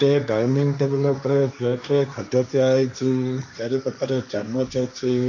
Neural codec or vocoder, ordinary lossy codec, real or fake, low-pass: codec, 16 kHz, 1.1 kbps, Voila-Tokenizer; none; fake; 7.2 kHz